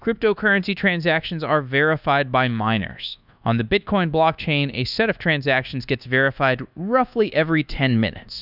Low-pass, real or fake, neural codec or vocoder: 5.4 kHz; fake; codec, 24 kHz, 1.2 kbps, DualCodec